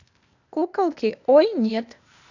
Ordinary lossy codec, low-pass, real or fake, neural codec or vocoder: none; 7.2 kHz; fake; codec, 16 kHz, 0.8 kbps, ZipCodec